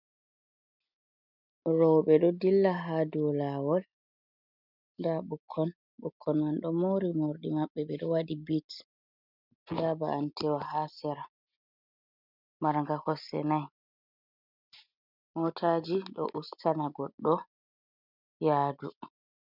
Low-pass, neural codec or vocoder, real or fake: 5.4 kHz; none; real